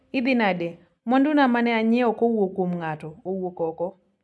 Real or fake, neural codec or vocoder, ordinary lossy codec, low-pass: real; none; none; none